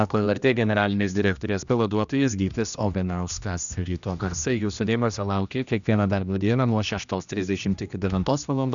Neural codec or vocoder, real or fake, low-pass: codec, 16 kHz, 1 kbps, X-Codec, HuBERT features, trained on general audio; fake; 7.2 kHz